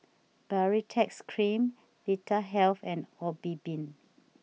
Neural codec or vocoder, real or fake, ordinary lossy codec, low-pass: none; real; none; none